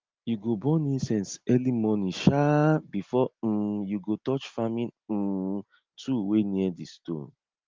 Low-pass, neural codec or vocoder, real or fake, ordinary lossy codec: 7.2 kHz; none; real; Opus, 32 kbps